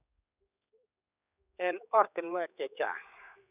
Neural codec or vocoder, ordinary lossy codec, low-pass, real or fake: codec, 16 kHz, 4 kbps, X-Codec, HuBERT features, trained on general audio; none; 3.6 kHz; fake